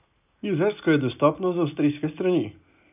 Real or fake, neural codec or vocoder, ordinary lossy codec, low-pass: real; none; none; 3.6 kHz